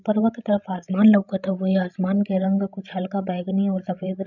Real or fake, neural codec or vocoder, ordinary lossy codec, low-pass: fake; codec, 16 kHz, 16 kbps, FreqCodec, larger model; none; 7.2 kHz